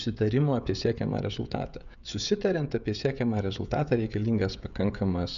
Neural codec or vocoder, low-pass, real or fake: codec, 16 kHz, 16 kbps, FreqCodec, smaller model; 7.2 kHz; fake